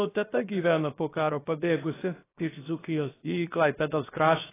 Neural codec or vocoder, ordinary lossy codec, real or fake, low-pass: codec, 16 kHz, 0.7 kbps, FocalCodec; AAC, 16 kbps; fake; 3.6 kHz